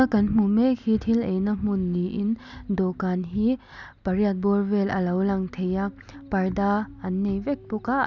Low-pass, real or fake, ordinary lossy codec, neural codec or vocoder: 7.2 kHz; real; none; none